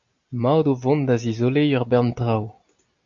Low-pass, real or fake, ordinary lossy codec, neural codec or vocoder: 7.2 kHz; real; AAC, 64 kbps; none